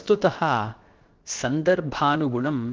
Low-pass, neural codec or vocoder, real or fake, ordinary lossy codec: 7.2 kHz; codec, 16 kHz, about 1 kbps, DyCAST, with the encoder's durations; fake; Opus, 16 kbps